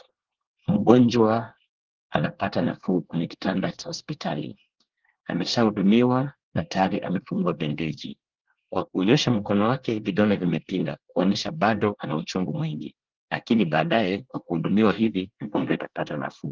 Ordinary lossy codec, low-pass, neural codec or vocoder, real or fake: Opus, 16 kbps; 7.2 kHz; codec, 24 kHz, 1 kbps, SNAC; fake